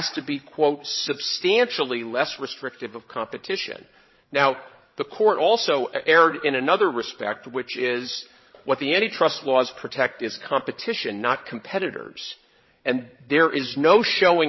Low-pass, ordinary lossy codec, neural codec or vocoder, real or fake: 7.2 kHz; MP3, 24 kbps; codec, 16 kHz, 16 kbps, FreqCodec, larger model; fake